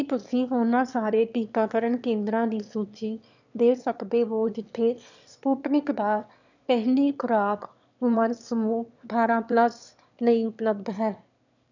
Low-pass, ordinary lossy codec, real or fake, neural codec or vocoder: 7.2 kHz; none; fake; autoencoder, 22.05 kHz, a latent of 192 numbers a frame, VITS, trained on one speaker